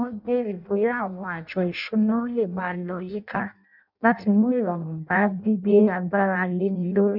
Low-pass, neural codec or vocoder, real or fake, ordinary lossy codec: 5.4 kHz; codec, 16 kHz in and 24 kHz out, 0.6 kbps, FireRedTTS-2 codec; fake; none